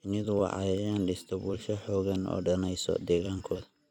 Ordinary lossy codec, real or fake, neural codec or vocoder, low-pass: none; fake; vocoder, 44.1 kHz, 128 mel bands every 256 samples, BigVGAN v2; 19.8 kHz